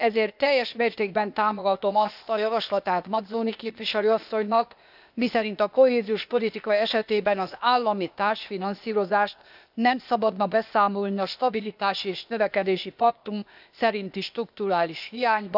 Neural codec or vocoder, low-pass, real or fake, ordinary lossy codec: codec, 16 kHz, 0.8 kbps, ZipCodec; 5.4 kHz; fake; none